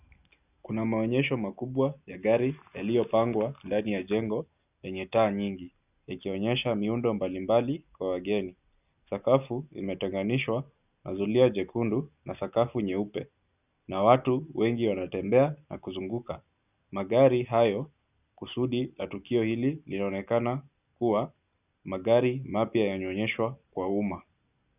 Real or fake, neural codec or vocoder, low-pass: real; none; 3.6 kHz